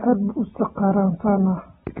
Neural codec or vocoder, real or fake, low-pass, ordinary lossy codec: none; real; 19.8 kHz; AAC, 16 kbps